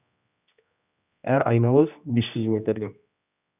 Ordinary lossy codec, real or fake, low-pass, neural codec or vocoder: none; fake; 3.6 kHz; codec, 16 kHz, 1 kbps, X-Codec, HuBERT features, trained on general audio